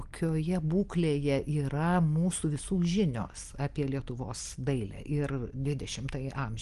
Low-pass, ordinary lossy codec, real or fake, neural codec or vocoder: 10.8 kHz; Opus, 24 kbps; real; none